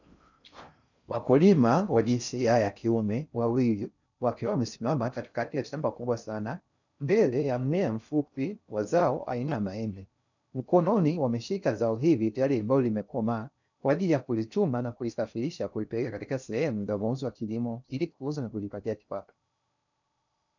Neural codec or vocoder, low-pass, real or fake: codec, 16 kHz in and 24 kHz out, 0.6 kbps, FocalCodec, streaming, 4096 codes; 7.2 kHz; fake